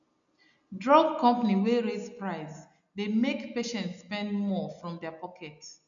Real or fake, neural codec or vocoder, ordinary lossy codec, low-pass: real; none; none; 7.2 kHz